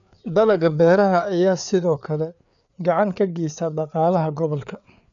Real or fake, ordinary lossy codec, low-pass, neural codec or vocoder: fake; none; 7.2 kHz; codec, 16 kHz, 4 kbps, FreqCodec, larger model